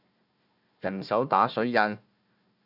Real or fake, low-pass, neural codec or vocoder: fake; 5.4 kHz; codec, 16 kHz, 1 kbps, FunCodec, trained on Chinese and English, 50 frames a second